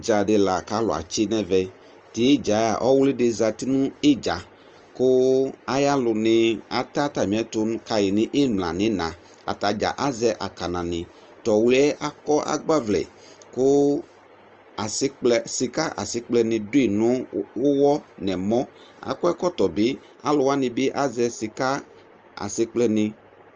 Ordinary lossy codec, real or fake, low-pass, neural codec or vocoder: Opus, 24 kbps; real; 7.2 kHz; none